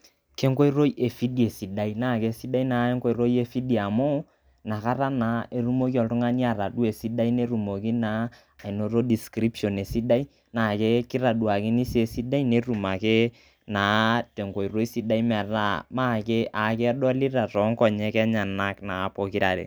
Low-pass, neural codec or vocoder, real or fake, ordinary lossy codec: none; none; real; none